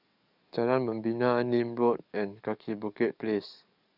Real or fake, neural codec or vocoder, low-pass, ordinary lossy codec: fake; codec, 44.1 kHz, 7.8 kbps, DAC; 5.4 kHz; none